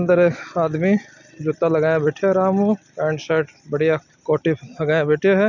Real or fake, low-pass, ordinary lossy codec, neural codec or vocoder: real; 7.2 kHz; none; none